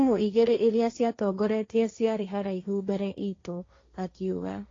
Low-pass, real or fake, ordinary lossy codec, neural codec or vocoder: 7.2 kHz; fake; AAC, 32 kbps; codec, 16 kHz, 1.1 kbps, Voila-Tokenizer